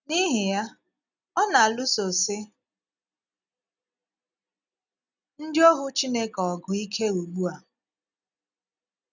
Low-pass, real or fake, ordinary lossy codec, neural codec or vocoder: 7.2 kHz; real; none; none